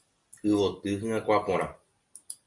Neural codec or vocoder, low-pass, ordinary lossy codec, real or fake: none; 10.8 kHz; MP3, 48 kbps; real